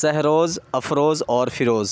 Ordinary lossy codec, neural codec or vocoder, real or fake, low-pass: none; none; real; none